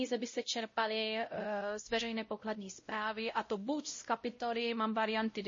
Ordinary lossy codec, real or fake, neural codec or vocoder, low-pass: MP3, 32 kbps; fake; codec, 16 kHz, 0.5 kbps, X-Codec, WavLM features, trained on Multilingual LibriSpeech; 7.2 kHz